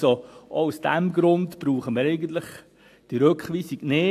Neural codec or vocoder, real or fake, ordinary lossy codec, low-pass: none; real; AAC, 64 kbps; 14.4 kHz